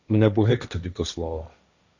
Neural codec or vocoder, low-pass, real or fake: codec, 16 kHz, 1.1 kbps, Voila-Tokenizer; 7.2 kHz; fake